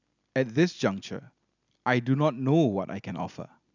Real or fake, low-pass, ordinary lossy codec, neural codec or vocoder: real; 7.2 kHz; none; none